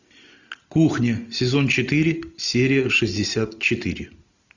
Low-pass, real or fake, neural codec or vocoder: 7.2 kHz; real; none